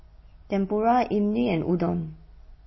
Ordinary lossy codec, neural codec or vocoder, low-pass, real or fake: MP3, 24 kbps; none; 7.2 kHz; real